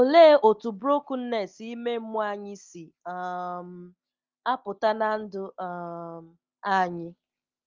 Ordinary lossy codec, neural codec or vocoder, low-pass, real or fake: Opus, 32 kbps; none; 7.2 kHz; real